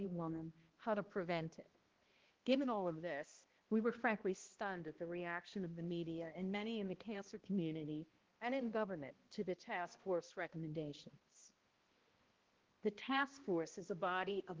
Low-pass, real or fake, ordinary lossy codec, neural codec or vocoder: 7.2 kHz; fake; Opus, 16 kbps; codec, 16 kHz, 1 kbps, X-Codec, HuBERT features, trained on balanced general audio